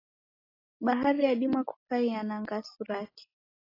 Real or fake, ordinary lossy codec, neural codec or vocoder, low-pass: real; AAC, 24 kbps; none; 5.4 kHz